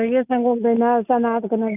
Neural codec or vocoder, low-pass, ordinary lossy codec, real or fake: none; 3.6 kHz; none; real